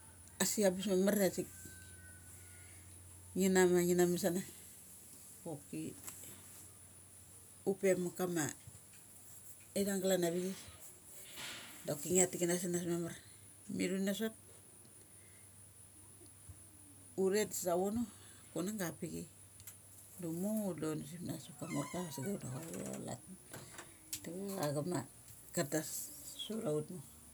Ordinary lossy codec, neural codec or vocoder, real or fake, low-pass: none; none; real; none